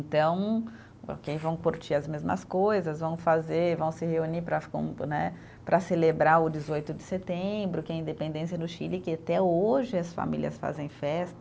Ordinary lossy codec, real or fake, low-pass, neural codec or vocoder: none; real; none; none